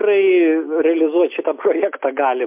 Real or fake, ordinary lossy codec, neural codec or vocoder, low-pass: real; MP3, 32 kbps; none; 3.6 kHz